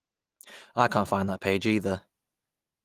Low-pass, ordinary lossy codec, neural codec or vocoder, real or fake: 14.4 kHz; Opus, 24 kbps; none; real